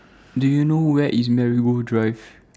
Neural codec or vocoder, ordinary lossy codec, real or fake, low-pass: none; none; real; none